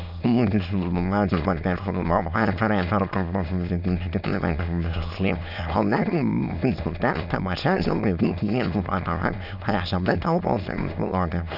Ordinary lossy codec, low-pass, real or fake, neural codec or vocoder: none; 5.4 kHz; fake; autoencoder, 22.05 kHz, a latent of 192 numbers a frame, VITS, trained on many speakers